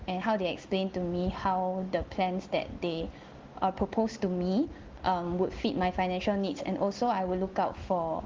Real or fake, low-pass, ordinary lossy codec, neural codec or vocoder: real; 7.2 kHz; Opus, 16 kbps; none